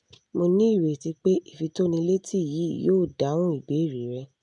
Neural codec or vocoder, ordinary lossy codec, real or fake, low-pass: none; none; real; 10.8 kHz